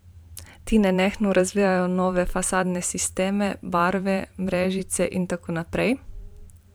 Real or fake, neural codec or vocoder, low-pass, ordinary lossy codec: fake; vocoder, 44.1 kHz, 128 mel bands every 512 samples, BigVGAN v2; none; none